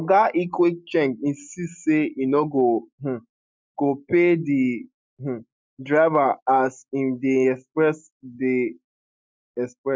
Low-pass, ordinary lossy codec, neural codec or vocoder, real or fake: none; none; none; real